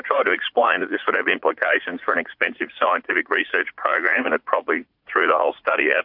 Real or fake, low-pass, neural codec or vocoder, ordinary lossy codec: fake; 5.4 kHz; vocoder, 44.1 kHz, 80 mel bands, Vocos; MP3, 48 kbps